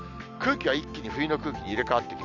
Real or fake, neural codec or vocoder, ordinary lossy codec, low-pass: real; none; none; 7.2 kHz